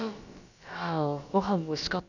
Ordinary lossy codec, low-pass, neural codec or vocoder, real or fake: none; 7.2 kHz; codec, 16 kHz, about 1 kbps, DyCAST, with the encoder's durations; fake